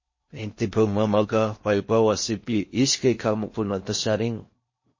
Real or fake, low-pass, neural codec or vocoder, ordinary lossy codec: fake; 7.2 kHz; codec, 16 kHz in and 24 kHz out, 0.6 kbps, FocalCodec, streaming, 4096 codes; MP3, 32 kbps